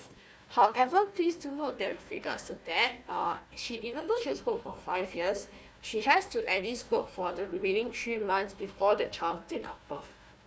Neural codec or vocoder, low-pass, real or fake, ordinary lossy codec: codec, 16 kHz, 1 kbps, FunCodec, trained on Chinese and English, 50 frames a second; none; fake; none